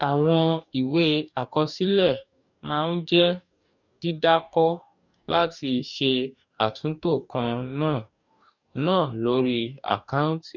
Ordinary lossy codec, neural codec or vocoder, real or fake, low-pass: none; codec, 44.1 kHz, 2.6 kbps, DAC; fake; 7.2 kHz